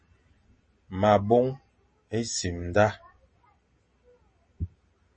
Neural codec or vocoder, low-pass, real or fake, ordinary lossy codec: none; 9.9 kHz; real; MP3, 32 kbps